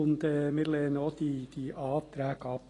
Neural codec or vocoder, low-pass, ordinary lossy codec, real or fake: none; 10.8 kHz; AAC, 32 kbps; real